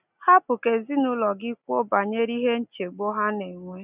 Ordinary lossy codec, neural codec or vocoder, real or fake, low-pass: none; none; real; 3.6 kHz